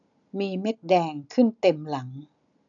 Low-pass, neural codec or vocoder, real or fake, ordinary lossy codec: 7.2 kHz; none; real; none